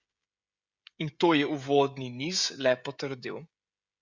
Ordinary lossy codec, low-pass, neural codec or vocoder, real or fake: Opus, 64 kbps; 7.2 kHz; codec, 16 kHz, 16 kbps, FreqCodec, smaller model; fake